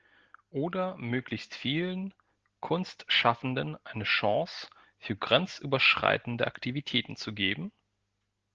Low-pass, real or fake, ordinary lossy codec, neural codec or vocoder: 7.2 kHz; real; Opus, 16 kbps; none